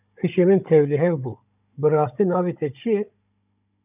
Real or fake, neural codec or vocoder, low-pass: fake; codec, 16 kHz, 16 kbps, FunCodec, trained on Chinese and English, 50 frames a second; 3.6 kHz